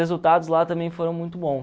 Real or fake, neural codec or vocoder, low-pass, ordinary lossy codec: real; none; none; none